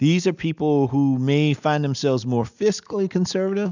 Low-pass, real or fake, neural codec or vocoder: 7.2 kHz; real; none